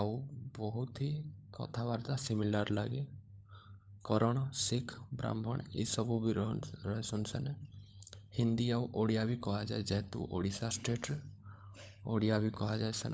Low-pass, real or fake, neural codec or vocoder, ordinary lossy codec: none; fake; codec, 16 kHz, 16 kbps, FunCodec, trained on LibriTTS, 50 frames a second; none